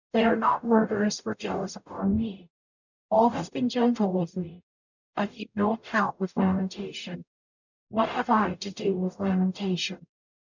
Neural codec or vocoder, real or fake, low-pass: codec, 44.1 kHz, 0.9 kbps, DAC; fake; 7.2 kHz